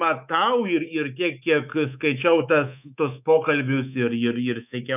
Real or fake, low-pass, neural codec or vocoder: fake; 3.6 kHz; codec, 24 kHz, 3.1 kbps, DualCodec